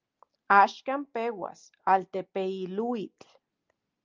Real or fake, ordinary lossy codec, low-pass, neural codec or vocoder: real; Opus, 24 kbps; 7.2 kHz; none